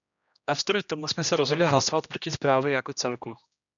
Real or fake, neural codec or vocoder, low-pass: fake; codec, 16 kHz, 1 kbps, X-Codec, HuBERT features, trained on general audio; 7.2 kHz